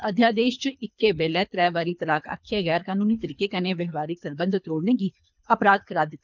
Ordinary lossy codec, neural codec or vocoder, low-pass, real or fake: none; codec, 24 kHz, 3 kbps, HILCodec; 7.2 kHz; fake